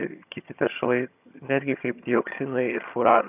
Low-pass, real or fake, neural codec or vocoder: 3.6 kHz; fake; vocoder, 22.05 kHz, 80 mel bands, HiFi-GAN